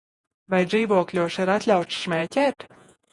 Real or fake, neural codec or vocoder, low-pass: fake; vocoder, 48 kHz, 128 mel bands, Vocos; 10.8 kHz